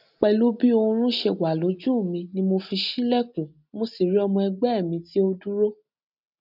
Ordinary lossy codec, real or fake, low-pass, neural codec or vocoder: none; real; 5.4 kHz; none